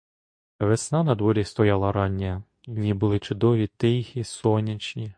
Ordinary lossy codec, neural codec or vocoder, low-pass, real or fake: MP3, 48 kbps; codec, 24 kHz, 0.9 kbps, WavTokenizer, medium speech release version 2; 9.9 kHz; fake